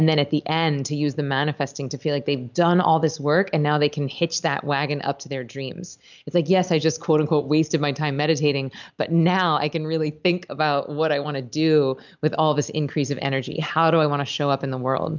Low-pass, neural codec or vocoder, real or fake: 7.2 kHz; none; real